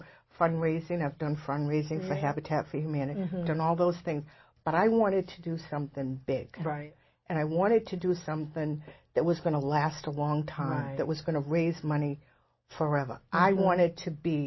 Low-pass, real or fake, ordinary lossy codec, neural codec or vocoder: 7.2 kHz; real; MP3, 24 kbps; none